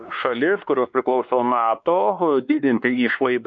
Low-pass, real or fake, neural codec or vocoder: 7.2 kHz; fake; codec, 16 kHz, 2 kbps, X-Codec, WavLM features, trained on Multilingual LibriSpeech